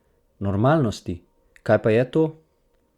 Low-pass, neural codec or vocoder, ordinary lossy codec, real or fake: 19.8 kHz; none; Opus, 64 kbps; real